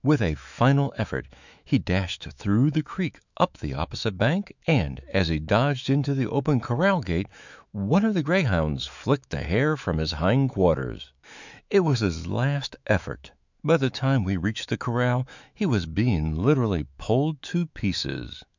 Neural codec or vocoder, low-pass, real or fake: autoencoder, 48 kHz, 128 numbers a frame, DAC-VAE, trained on Japanese speech; 7.2 kHz; fake